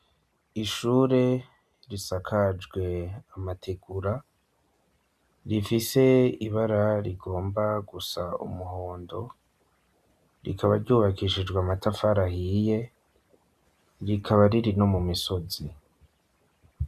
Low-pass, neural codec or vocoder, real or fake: 14.4 kHz; none; real